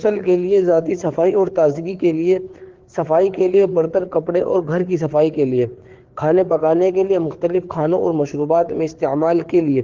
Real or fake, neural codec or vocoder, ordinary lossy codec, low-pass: fake; codec, 16 kHz, 4 kbps, FreqCodec, larger model; Opus, 16 kbps; 7.2 kHz